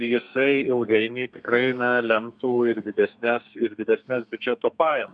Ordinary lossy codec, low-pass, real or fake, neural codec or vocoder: MP3, 64 kbps; 9.9 kHz; fake; codec, 32 kHz, 1.9 kbps, SNAC